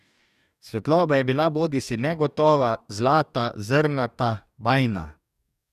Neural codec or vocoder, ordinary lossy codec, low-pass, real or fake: codec, 44.1 kHz, 2.6 kbps, DAC; none; 14.4 kHz; fake